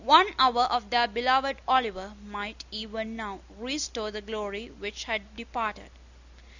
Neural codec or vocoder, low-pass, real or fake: none; 7.2 kHz; real